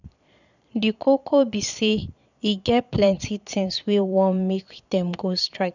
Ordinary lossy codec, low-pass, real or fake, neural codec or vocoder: none; 7.2 kHz; fake; vocoder, 22.05 kHz, 80 mel bands, Vocos